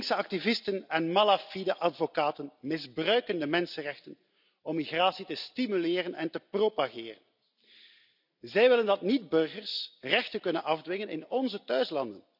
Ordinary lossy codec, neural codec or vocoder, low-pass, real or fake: none; none; 5.4 kHz; real